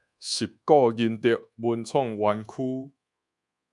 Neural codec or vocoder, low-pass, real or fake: codec, 24 kHz, 1.2 kbps, DualCodec; 10.8 kHz; fake